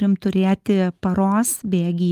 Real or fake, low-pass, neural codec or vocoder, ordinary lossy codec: real; 14.4 kHz; none; Opus, 24 kbps